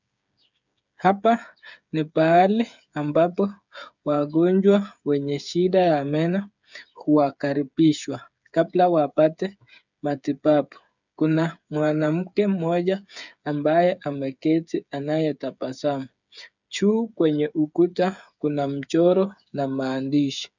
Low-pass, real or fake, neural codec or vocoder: 7.2 kHz; fake; codec, 16 kHz, 8 kbps, FreqCodec, smaller model